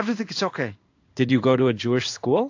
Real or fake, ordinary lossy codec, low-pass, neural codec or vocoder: fake; AAC, 48 kbps; 7.2 kHz; codec, 16 kHz in and 24 kHz out, 1 kbps, XY-Tokenizer